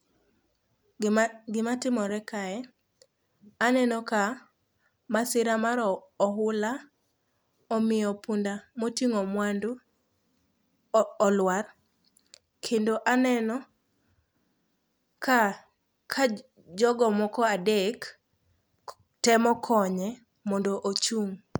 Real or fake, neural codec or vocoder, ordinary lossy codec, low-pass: real; none; none; none